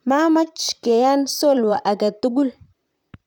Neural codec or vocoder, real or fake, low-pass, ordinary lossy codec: vocoder, 44.1 kHz, 128 mel bands, Pupu-Vocoder; fake; 19.8 kHz; none